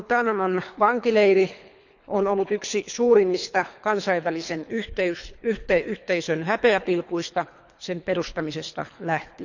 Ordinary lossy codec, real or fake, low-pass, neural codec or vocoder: none; fake; 7.2 kHz; codec, 24 kHz, 3 kbps, HILCodec